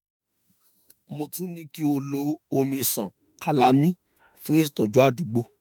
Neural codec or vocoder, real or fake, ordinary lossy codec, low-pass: autoencoder, 48 kHz, 32 numbers a frame, DAC-VAE, trained on Japanese speech; fake; none; none